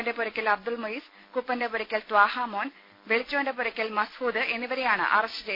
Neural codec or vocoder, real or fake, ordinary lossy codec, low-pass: none; real; MP3, 32 kbps; 5.4 kHz